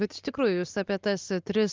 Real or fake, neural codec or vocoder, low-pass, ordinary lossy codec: real; none; 7.2 kHz; Opus, 32 kbps